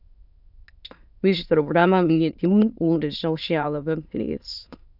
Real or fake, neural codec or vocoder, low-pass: fake; autoencoder, 22.05 kHz, a latent of 192 numbers a frame, VITS, trained on many speakers; 5.4 kHz